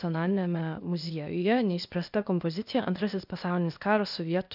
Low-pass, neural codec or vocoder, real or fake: 5.4 kHz; codec, 16 kHz, 0.8 kbps, ZipCodec; fake